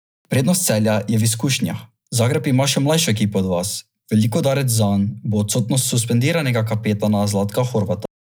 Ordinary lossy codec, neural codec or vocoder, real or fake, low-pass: none; none; real; none